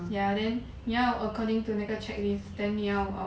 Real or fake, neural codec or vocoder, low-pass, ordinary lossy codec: real; none; none; none